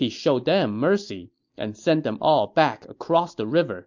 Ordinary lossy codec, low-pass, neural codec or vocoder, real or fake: MP3, 64 kbps; 7.2 kHz; none; real